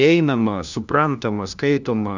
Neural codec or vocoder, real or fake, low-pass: codec, 16 kHz, 1 kbps, FunCodec, trained on LibriTTS, 50 frames a second; fake; 7.2 kHz